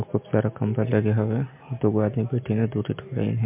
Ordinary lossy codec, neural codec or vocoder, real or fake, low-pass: MP3, 32 kbps; none; real; 3.6 kHz